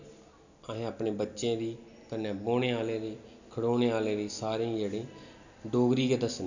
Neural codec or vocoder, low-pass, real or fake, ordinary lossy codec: none; 7.2 kHz; real; none